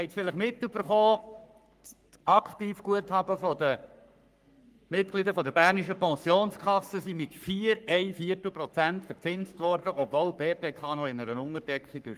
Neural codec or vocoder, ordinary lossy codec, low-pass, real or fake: codec, 44.1 kHz, 3.4 kbps, Pupu-Codec; Opus, 32 kbps; 14.4 kHz; fake